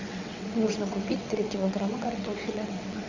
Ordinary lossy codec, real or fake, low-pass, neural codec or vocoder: Opus, 64 kbps; fake; 7.2 kHz; vocoder, 22.05 kHz, 80 mel bands, WaveNeXt